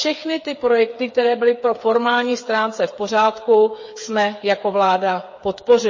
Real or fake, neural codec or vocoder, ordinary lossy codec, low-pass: fake; codec, 16 kHz, 8 kbps, FreqCodec, smaller model; MP3, 32 kbps; 7.2 kHz